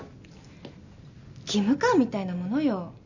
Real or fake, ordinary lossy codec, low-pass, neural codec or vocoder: real; none; 7.2 kHz; none